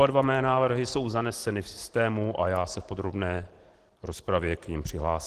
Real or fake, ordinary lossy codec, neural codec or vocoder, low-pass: real; Opus, 16 kbps; none; 14.4 kHz